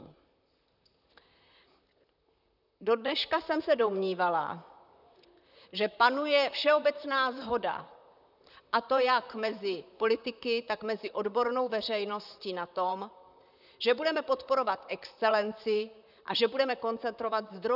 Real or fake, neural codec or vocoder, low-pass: fake; vocoder, 44.1 kHz, 128 mel bands, Pupu-Vocoder; 5.4 kHz